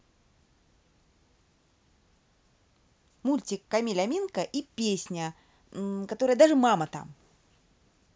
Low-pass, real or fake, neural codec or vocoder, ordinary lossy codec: none; real; none; none